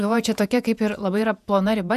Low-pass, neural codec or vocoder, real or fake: 14.4 kHz; vocoder, 48 kHz, 128 mel bands, Vocos; fake